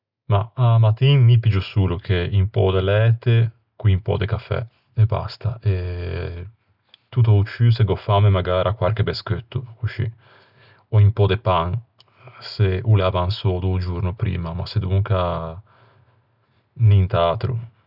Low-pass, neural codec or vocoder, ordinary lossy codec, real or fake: 5.4 kHz; none; none; real